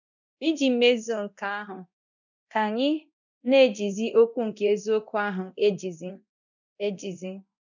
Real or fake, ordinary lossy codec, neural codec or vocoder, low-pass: fake; none; codec, 24 kHz, 0.9 kbps, DualCodec; 7.2 kHz